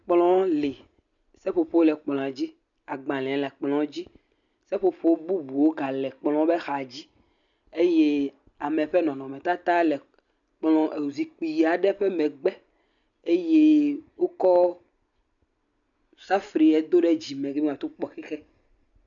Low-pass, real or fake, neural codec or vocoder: 7.2 kHz; real; none